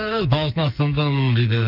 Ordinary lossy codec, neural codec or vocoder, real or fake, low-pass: none; codec, 44.1 kHz, 2.6 kbps, SNAC; fake; 5.4 kHz